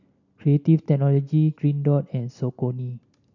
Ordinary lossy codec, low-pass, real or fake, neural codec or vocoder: MP3, 64 kbps; 7.2 kHz; real; none